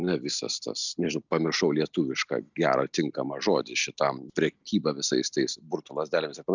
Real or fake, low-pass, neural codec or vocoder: real; 7.2 kHz; none